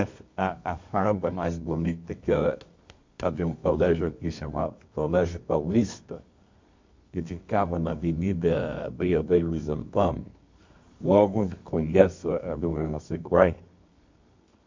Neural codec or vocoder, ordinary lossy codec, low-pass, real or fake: codec, 24 kHz, 0.9 kbps, WavTokenizer, medium music audio release; MP3, 48 kbps; 7.2 kHz; fake